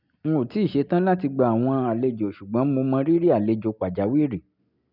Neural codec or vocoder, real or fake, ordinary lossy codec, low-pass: none; real; none; 5.4 kHz